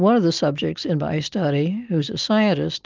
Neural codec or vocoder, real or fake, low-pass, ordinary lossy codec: none; real; 7.2 kHz; Opus, 24 kbps